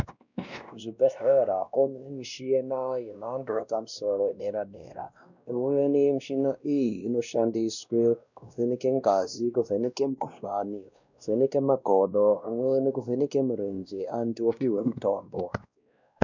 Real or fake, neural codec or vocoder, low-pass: fake; codec, 16 kHz, 1 kbps, X-Codec, WavLM features, trained on Multilingual LibriSpeech; 7.2 kHz